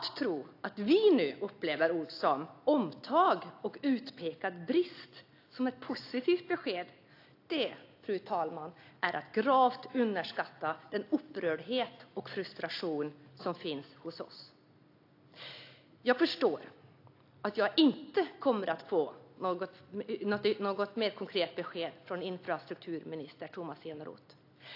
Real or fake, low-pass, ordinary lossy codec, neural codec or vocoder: real; 5.4 kHz; AAC, 32 kbps; none